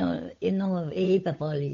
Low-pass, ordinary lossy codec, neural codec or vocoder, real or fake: 7.2 kHz; MP3, 48 kbps; codec, 16 kHz, 8 kbps, FunCodec, trained on Chinese and English, 25 frames a second; fake